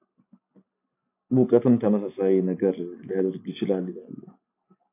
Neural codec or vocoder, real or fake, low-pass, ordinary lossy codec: none; real; 3.6 kHz; AAC, 24 kbps